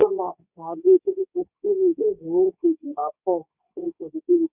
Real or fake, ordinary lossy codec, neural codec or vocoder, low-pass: fake; none; codec, 24 kHz, 0.9 kbps, WavTokenizer, medium speech release version 2; 3.6 kHz